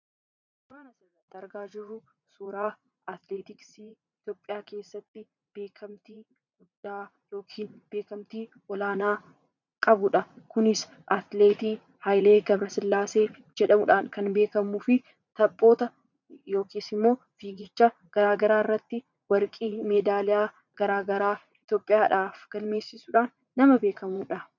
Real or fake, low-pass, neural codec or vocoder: fake; 7.2 kHz; vocoder, 44.1 kHz, 80 mel bands, Vocos